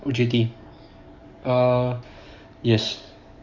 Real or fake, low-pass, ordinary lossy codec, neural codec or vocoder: fake; 7.2 kHz; none; codec, 44.1 kHz, 7.8 kbps, DAC